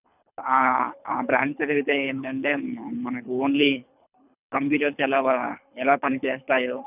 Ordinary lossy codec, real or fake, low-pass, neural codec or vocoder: none; fake; 3.6 kHz; codec, 24 kHz, 3 kbps, HILCodec